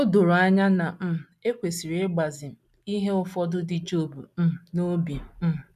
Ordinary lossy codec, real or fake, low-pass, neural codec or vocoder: none; real; 14.4 kHz; none